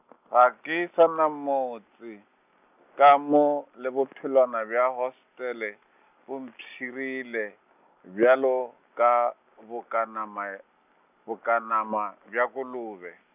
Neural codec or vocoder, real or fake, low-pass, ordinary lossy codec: none; real; 3.6 kHz; none